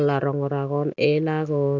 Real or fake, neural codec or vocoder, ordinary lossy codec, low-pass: real; none; none; 7.2 kHz